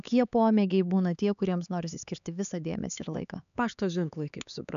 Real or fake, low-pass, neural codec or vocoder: fake; 7.2 kHz; codec, 16 kHz, 4 kbps, X-Codec, HuBERT features, trained on LibriSpeech